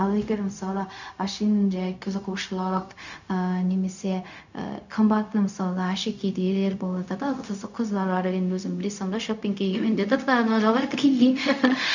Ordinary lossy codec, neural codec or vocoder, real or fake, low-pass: none; codec, 16 kHz, 0.4 kbps, LongCat-Audio-Codec; fake; 7.2 kHz